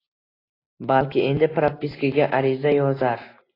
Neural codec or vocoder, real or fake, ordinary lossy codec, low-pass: none; real; AAC, 24 kbps; 5.4 kHz